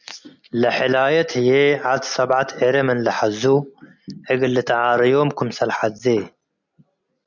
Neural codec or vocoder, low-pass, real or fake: none; 7.2 kHz; real